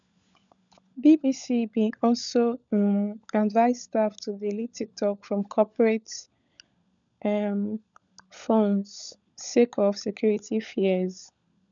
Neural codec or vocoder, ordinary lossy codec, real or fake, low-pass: codec, 16 kHz, 16 kbps, FunCodec, trained on LibriTTS, 50 frames a second; none; fake; 7.2 kHz